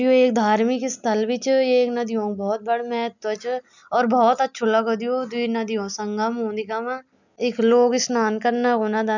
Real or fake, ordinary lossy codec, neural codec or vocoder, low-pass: real; none; none; 7.2 kHz